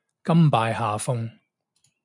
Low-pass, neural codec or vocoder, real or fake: 10.8 kHz; none; real